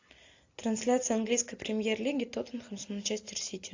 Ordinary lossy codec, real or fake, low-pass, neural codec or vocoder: AAC, 48 kbps; real; 7.2 kHz; none